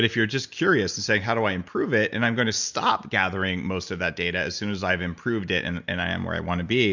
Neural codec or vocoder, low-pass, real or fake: none; 7.2 kHz; real